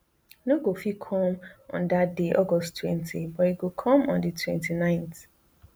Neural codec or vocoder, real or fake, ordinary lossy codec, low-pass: none; real; none; none